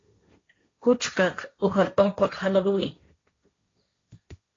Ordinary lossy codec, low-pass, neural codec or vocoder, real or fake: AAC, 32 kbps; 7.2 kHz; codec, 16 kHz, 1.1 kbps, Voila-Tokenizer; fake